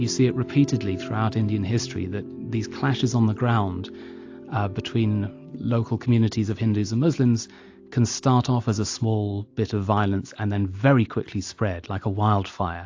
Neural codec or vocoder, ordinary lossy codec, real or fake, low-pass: none; AAC, 48 kbps; real; 7.2 kHz